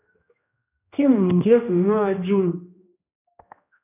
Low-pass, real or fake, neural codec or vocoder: 3.6 kHz; fake; codec, 16 kHz, 1 kbps, X-Codec, HuBERT features, trained on general audio